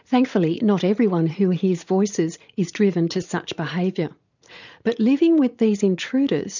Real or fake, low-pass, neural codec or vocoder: fake; 7.2 kHz; vocoder, 44.1 kHz, 80 mel bands, Vocos